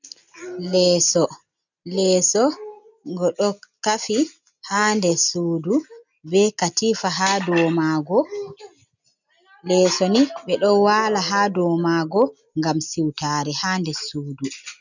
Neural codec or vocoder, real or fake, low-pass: none; real; 7.2 kHz